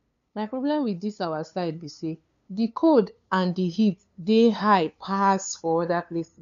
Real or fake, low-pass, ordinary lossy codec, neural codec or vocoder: fake; 7.2 kHz; none; codec, 16 kHz, 2 kbps, FunCodec, trained on LibriTTS, 25 frames a second